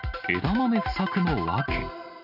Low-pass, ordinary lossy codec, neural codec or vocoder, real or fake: 5.4 kHz; none; none; real